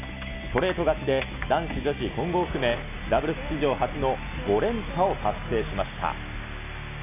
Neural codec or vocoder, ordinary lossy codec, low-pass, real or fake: none; none; 3.6 kHz; real